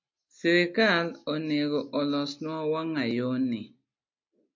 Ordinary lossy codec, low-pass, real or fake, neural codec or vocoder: MP3, 48 kbps; 7.2 kHz; real; none